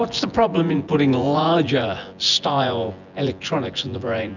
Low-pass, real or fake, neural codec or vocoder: 7.2 kHz; fake; vocoder, 24 kHz, 100 mel bands, Vocos